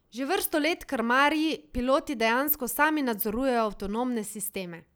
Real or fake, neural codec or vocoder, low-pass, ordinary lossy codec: real; none; none; none